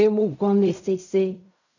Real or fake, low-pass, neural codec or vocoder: fake; 7.2 kHz; codec, 16 kHz in and 24 kHz out, 0.4 kbps, LongCat-Audio-Codec, fine tuned four codebook decoder